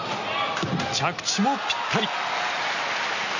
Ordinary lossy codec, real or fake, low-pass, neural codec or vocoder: none; real; 7.2 kHz; none